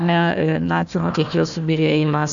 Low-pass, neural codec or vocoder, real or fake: 7.2 kHz; codec, 16 kHz, 1 kbps, FunCodec, trained on Chinese and English, 50 frames a second; fake